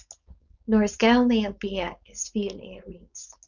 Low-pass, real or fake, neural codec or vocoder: 7.2 kHz; fake; codec, 16 kHz, 4.8 kbps, FACodec